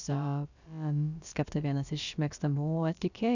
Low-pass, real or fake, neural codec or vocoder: 7.2 kHz; fake; codec, 16 kHz, about 1 kbps, DyCAST, with the encoder's durations